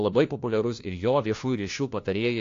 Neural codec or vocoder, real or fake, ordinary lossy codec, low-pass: codec, 16 kHz, 1 kbps, FunCodec, trained on LibriTTS, 50 frames a second; fake; AAC, 48 kbps; 7.2 kHz